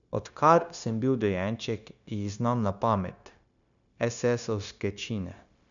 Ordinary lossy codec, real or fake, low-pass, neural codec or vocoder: none; fake; 7.2 kHz; codec, 16 kHz, 0.9 kbps, LongCat-Audio-Codec